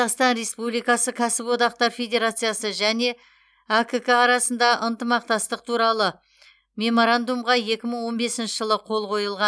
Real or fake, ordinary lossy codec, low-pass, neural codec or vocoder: real; none; none; none